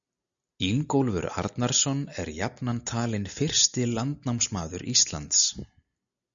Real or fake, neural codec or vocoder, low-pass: real; none; 7.2 kHz